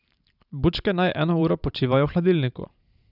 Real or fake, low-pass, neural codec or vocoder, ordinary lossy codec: fake; 5.4 kHz; vocoder, 44.1 kHz, 128 mel bands every 512 samples, BigVGAN v2; none